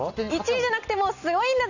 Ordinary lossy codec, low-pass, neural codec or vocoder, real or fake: none; 7.2 kHz; none; real